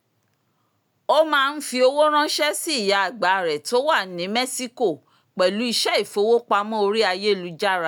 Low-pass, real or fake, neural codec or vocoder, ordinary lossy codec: none; real; none; none